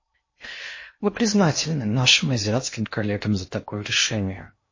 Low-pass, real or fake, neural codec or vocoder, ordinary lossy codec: 7.2 kHz; fake; codec, 16 kHz in and 24 kHz out, 0.8 kbps, FocalCodec, streaming, 65536 codes; MP3, 32 kbps